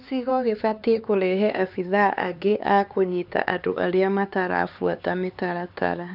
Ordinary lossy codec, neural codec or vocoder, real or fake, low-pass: none; codec, 16 kHz, 4 kbps, X-Codec, HuBERT features, trained on LibriSpeech; fake; 5.4 kHz